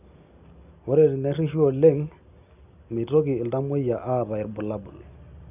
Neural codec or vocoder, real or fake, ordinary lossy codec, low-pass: none; real; none; 3.6 kHz